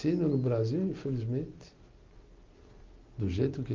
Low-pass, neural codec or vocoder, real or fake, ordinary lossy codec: 7.2 kHz; none; real; Opus, 24 kbps